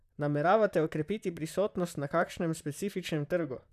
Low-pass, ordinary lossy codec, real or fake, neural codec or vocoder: 14.4 kHz; none; fake; vocoder, 44.1 kHz, 128 mel bands, Pupu-Vocoder